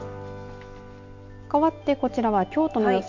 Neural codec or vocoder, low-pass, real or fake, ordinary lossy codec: none; 7.2 kHz; real; MP3, 48 kbps